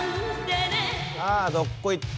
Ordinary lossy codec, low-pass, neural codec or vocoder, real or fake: none; none; none; real